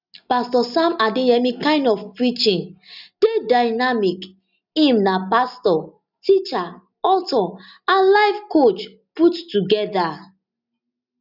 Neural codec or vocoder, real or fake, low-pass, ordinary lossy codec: none; real; 5.4 kHz; none